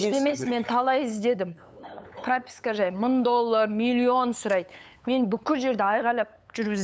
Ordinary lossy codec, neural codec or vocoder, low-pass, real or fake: none; codec, 16 kHz, 8 kbps, FunCodec, trained on LibriTTS, 25 frames a second; none; fake